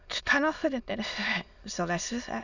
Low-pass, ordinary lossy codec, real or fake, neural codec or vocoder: 7.2 kHz; none; fake; autoencoder, 22.05 kHz, a latent of 192 numbers a frame, VITS, trained on many speakers